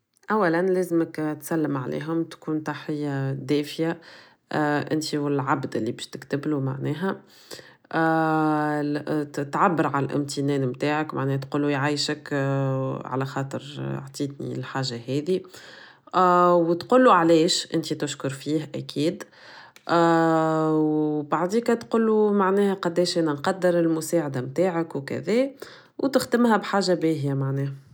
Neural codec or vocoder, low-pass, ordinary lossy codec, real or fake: none; none; none; real